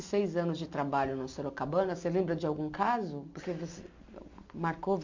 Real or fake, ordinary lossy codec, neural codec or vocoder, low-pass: real; MP3, 48 kbps; none; 7.2 kHz